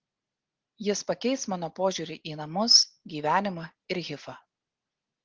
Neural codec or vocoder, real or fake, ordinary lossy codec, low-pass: none; real; Opus, 16 kbps; 7.2 kHz